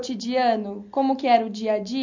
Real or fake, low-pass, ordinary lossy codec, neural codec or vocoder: real; 7.2 kHz; MP3, 48 kbps; none